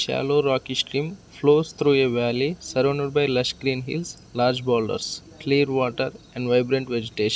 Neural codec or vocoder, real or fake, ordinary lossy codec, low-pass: none; real; none; none